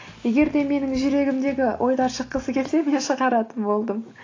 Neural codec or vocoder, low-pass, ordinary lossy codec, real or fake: none; 7.2 kHz; AAC, 32 kbps; real